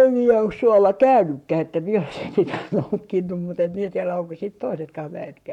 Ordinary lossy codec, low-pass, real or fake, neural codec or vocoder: none; 19.8 kHz; fake; codec, 44.1 kHz, 7.8 kbps, Pupu-Codec